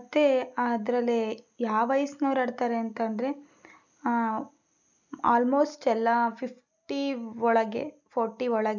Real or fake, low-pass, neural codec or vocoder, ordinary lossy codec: real; 7.2 kHz; none; none